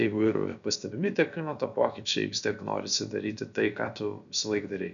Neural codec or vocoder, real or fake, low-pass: codec, 16 kHz, about 1 kbps, DyCAST, with the encoder's durations; fake; 7.2 kHz